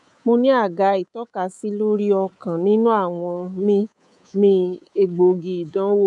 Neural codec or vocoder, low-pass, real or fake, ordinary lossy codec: codec, 24 kHz, 3.1 kbps, DualCodec; 10.8 kHz; fake; none